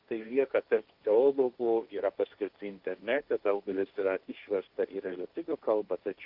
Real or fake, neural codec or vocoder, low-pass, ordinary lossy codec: fake; codec, 16 kHz, 1.1 kbps, Voila-Tokenizer; 5.4 kHz; Opus, 32 kbps